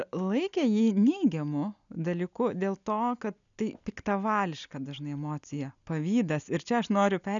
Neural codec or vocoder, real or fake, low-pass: none; real; 7.2 kHz